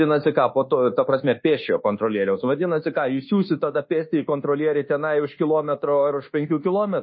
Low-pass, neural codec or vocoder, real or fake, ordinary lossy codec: 7.2 kHz; codec, 24 kHz, 1.2 kbps, DualCodec; fake; MP3, 24 kbps